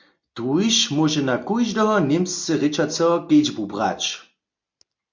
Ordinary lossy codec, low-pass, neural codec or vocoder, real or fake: MP3, 48 kbps; 7.2 kHz; none; real